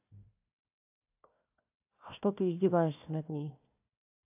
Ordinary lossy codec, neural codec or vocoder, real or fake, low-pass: AAC, 32 kbps; codec, 16 kHz, 1 kbps, FunCodec, trained on Chinese and English, 50 frames a second; fake; 3.6 kHz